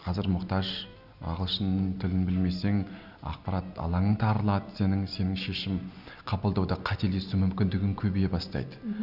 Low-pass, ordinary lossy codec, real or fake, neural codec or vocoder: 5.4 kHz; none; real; none